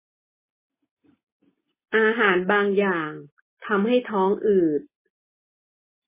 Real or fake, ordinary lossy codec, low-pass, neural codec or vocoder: real; MP3, 16 kbps; 3.6 kHz; none